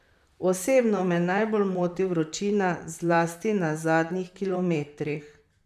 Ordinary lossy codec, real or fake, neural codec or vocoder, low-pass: none; fake; vocoder, 44.1 kHz, 128 mel bands, Pupu-Vocoder; 14.4 kHz